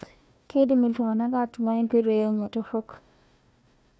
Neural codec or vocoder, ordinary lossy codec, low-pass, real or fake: codec, 16 kHz, 1 kbps, FunCodec, trained on Chinese and English, 50 frames a second; none; none; fake